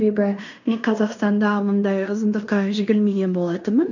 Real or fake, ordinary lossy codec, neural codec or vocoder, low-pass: fake; none; codec, 16 kHz in and 24 kHz out, 0.9 kbps, LongCat-Audio-Codec, fine tuned four codebook decoder; 7.2 kHz